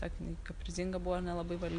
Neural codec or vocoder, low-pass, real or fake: none; 9.9 kHz; real